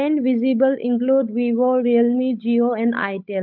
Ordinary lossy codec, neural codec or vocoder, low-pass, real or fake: none; codec, 16 kHz, 8 kbps, FunCodec, trained on Chinese and English, 25 frames a second; 5.4 kHz; fake